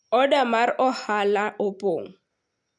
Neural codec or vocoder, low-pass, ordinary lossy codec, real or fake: none; 10.8 kHz; none; real